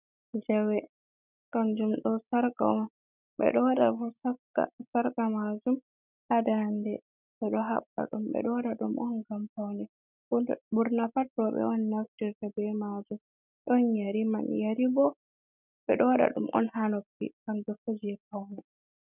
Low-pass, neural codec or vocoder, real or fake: 3.6 kHz; none; real